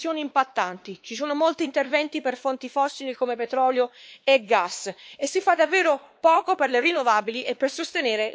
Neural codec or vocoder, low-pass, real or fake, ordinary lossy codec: codec, 16 kHz, 2 kbps, X-Codec, WavLM features, trained on Multilingual LibriSpeech; none; fake; none